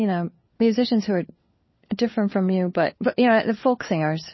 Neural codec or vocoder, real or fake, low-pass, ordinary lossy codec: codec, 16 kHz, 4 kbps, FunCodec, trained on LibriTTS, 50 frames a second; fake; 7.2 kHz; MP3, 24 kbps